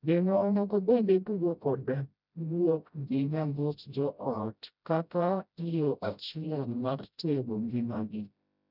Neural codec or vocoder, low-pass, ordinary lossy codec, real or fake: codec, 16 kHz, 0.5 kbps, FreqCodec, smaller model; 5.4 kHz; none; fake